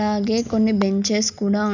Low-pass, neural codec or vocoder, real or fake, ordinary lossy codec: 7.2 kHz; none; real; none